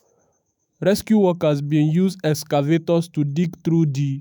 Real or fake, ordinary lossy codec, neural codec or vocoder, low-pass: fake; none; autoencoder, 48 kHz, 128 numbers a frame, DAC-VAE, trained on Japanese speech; none